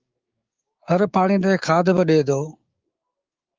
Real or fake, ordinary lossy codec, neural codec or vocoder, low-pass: fake; Opus, 32 kbps; codec, 16 kHz, 6 kbps, DAC; 7.2 kHz